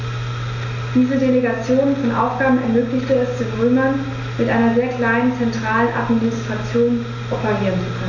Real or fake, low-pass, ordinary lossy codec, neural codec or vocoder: real; 7.2 kHz; none; none